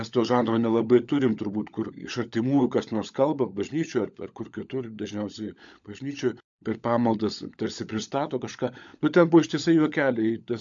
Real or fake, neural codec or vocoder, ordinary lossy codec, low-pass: fake; codec, 16 kHz, 16 kbps, FunCodec, trained on LibriTTS, 50 frames a second; MP3, 64 kbps; 7.2 kHz